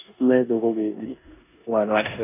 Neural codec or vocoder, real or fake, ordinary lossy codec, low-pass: codec, 16 kHz in and 24 kHz out, 0.9 kbps, LongCat-Audio-Codec, four codebook decoder; fake; AAC, 32 kbps; 3.6 kHz